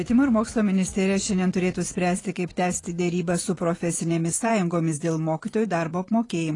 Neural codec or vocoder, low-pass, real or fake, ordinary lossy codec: vocoder, 24 kHz, 100 mel bands, Vocos; 10.8 kHz; fake; AAC, 32 kbps